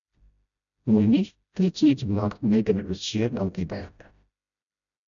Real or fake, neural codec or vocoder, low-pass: fake; codec, 16 kHz, 0.5 kbps, FreqCodec, smaller model; 7.2 kHz